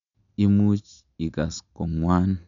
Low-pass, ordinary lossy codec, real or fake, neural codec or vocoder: 7.2 kHz; none; real; none